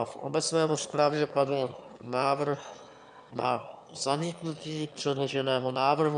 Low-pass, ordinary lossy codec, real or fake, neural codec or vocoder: 9.9 kHz; MP3, 96 kbps; fake; autoencoder, 22.05 kHz, a latent of 192 numbers a frame, VITS, trained on one speaker